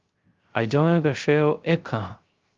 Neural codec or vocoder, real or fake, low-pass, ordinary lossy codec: codec, 16 kHz, 0.3 kbps, FocalCodec; fake; 7.2 kHz; Opus, 32 kbps